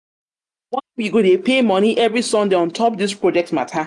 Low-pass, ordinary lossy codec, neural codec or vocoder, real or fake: 10.8 kHz; none; none; real